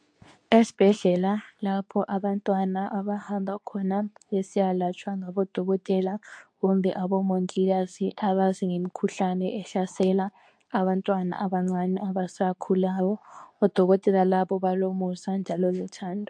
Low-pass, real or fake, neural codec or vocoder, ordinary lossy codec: 9.9 kHz; fake; codec, 24 kHz, 0.9 kbps, WavTokenizer, medium speech release version 2; AAC, 64 kbps